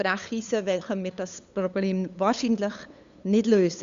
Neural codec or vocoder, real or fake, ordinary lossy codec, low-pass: codec, 16 kHz, 8 kbps, FunCodec, trained on LibriTTS, 25 frames a second; fake; Opus, 64 kbps; 7.2 kHz